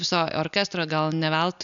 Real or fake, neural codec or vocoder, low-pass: real; none; 7.2 kHz